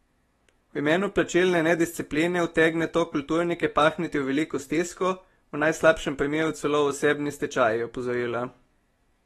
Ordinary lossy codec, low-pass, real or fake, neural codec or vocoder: AAC, 32 kbps; 19.8 kHz; fake; autoencoder, 48 kHz, 128 numbers a frame, DAC-VAE, trained on Japanese speech